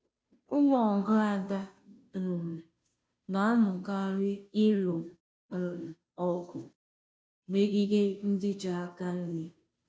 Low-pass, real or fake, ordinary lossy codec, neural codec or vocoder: none; fake; none; codec, 16 kHz, 0.5 kbps, FunCodec, trained on Chinese and English, 25 frames a second